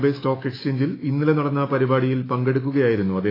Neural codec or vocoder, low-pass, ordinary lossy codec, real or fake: autoencoder, 48 kHz, 128 numbers a frame, DAC-VAE, trained on Japanese speech; 5.4 kHz; AAC, 24 kbps; fake